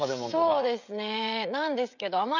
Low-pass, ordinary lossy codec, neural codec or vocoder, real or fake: 7.2 kHz; none; codec, 16 kHz, 16 kbps, FreqCodec, smaller model; fake